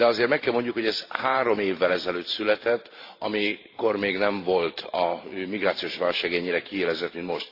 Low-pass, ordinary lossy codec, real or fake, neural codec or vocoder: 5.4 kHz; AAC, 32 kbps; fake; vocoder, 44.1 kHz, 128 mel bands every 512 samples, BigVGAN v2